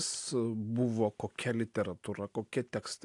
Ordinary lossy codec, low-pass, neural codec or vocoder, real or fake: AAC, 48 kbps; 10.8 kHz; none; real